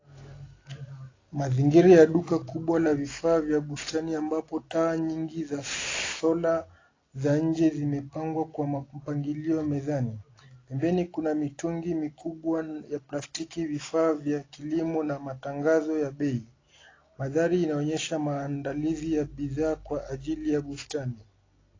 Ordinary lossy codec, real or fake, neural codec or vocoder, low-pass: AAC, 32 kbps; real; none; 7.2 kHz